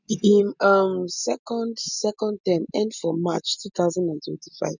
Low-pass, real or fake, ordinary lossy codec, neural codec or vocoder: 7.2 kHz; real; none; none